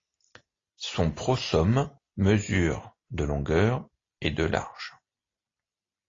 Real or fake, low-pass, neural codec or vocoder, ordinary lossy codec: real; 7.2 kHz; none; AAC, 32 kbps